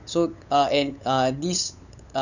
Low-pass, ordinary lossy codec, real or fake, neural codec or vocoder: 7.2 kHz; none; fake; codec, 16 kHz, 8 kbps, FunCodec, trained on LibriTTS, 25 frames a second